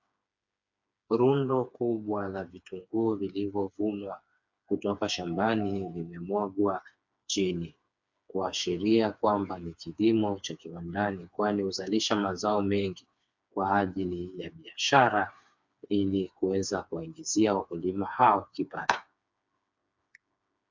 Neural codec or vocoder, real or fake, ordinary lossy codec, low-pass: codec, 16 kHz, 4 kbps, FreqCodec, smaller model; fake; MP3, 64 kbps; 7.2 kHz